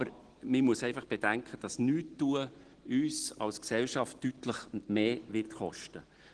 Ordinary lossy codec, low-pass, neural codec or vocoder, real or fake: Opus, 16 kbps; 9.9 kHz; none; real